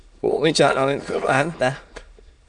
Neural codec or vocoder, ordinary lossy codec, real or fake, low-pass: autoencoder, 22.05 kHz, a latent of 192 numbers a frame, VITS, trained on many speakers; MP3, 96 kbps; fake; 9.9 kHz